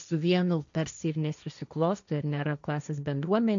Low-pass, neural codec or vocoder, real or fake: 7.2 kHz; codec, 16 kHz, 1.1 kbps, Voila-Tokenizer; fake